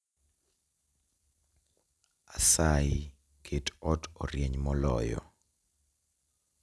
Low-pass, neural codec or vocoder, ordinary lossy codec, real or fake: none; none; none; real